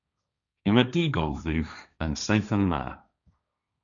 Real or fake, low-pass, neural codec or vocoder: fake; 7.2 kHz; codec, 16 kHz, 1.1 kbps, Voila-Tokenizer